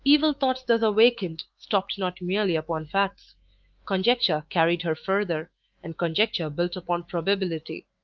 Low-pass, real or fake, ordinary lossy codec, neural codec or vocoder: 7.2 kHz; fake; Opus, 32 kbps; autoencoder, 48 kHz, 128 numbers a frame, DAC-VAE, trained on Japanese speech